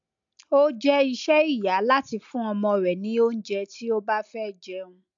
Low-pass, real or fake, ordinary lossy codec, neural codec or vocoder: 7.2 kHz; real; MP3, 64 kbps; none